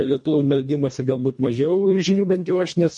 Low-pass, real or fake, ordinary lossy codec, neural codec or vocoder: 10.8 kHz; fake; MP3, 48 kbps; codec, 24 kHz, 1.5 kbps, HILCodec